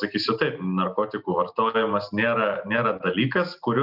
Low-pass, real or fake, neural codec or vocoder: 5.4 kHz; real; none